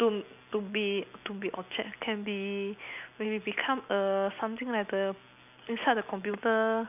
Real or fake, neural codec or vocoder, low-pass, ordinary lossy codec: real; none; 3.6 kHz; none